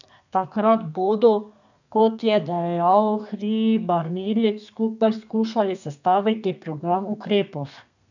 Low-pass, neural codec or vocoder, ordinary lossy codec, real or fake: 7.2 kHz; codec, 32 kHz, 1.9 kbps, SNAC; none; fake